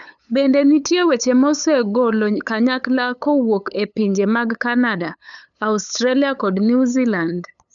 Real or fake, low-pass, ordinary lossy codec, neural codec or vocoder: fake; 7.2 kHz; none; codec, 16 kHz, 8 kbps, FunCodec, trained on LibriTTS, 25 frames a second